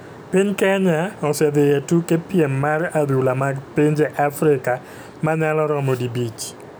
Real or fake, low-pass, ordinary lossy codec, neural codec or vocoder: real; none; none; none